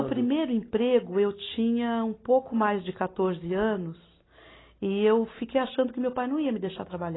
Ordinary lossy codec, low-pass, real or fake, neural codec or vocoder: AAC, 16 kbps; 7.2 kHz; real; none